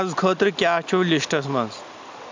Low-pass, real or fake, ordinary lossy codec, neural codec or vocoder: 7.2 kHz; real; MP3, 64 kbps; none